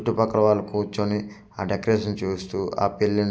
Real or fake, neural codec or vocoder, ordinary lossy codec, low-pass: real; none; none; none